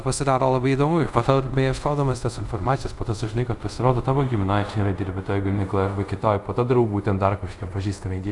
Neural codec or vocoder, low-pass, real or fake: codec, 24 kHz, 0.5 kbps, DualCodec; 10.8 kHz; fake